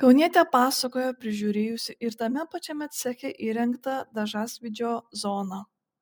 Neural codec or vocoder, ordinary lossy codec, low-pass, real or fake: none; MP3, 96 kbps; 19.8 kHz; real